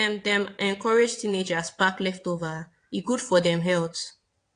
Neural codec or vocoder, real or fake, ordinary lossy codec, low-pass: vocoder, 22.05 kHz, 80 mel bands, WaveNeXt; fake; AAC, 48 kbps; 9.9 kHz